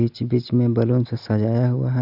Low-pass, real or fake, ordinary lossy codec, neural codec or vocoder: 5.4 kHz; real; none; none